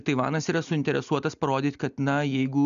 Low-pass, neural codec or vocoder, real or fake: 7.2 kHz; none; real